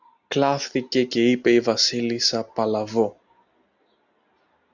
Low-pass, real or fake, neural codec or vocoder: 7.2 kHz; real; none